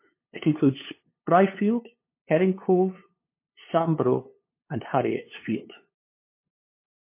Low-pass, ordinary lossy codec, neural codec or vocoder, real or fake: 3.6 kHz; MP3, 24 kbps; codec, 16 kHz, 2 kbps, FunCodec, trained on LibriTTS, 25 frames a second; fake